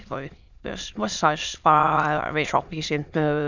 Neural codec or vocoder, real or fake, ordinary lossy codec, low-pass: autoencoder, 22.05 kHz, a latent of 192 numbers a frame, VITS, trained on many speakers; fake; none; 7.2 kHz